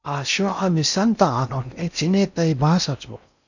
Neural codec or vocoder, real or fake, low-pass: codec, 16 kHz in and 24 kHz out, 0.6 kbps, FocalCodec, streaming, 2048 codes; fake; 7.2 kHz